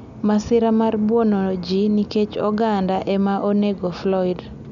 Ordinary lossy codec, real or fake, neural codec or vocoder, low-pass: none; real; none; 7.2 kHz